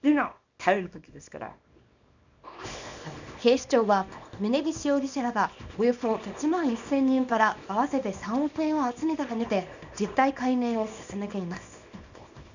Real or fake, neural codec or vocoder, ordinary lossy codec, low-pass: fake; codec, 24 kHz, 0.9 kbps, WavTokenizer, small release; none; 7.2 kHz